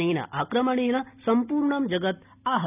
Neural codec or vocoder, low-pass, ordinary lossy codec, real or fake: none; 3.6 kHz; none; real